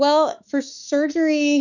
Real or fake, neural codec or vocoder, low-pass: fake; autoencoder, 48 kHz, 32 numbers a frame, DAC-VAE, trained on Japanese speech; 7.2 kHz